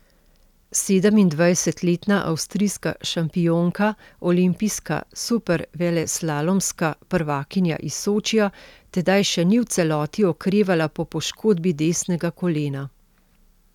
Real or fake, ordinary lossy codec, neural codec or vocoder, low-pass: real; none; none; 19.8 kHz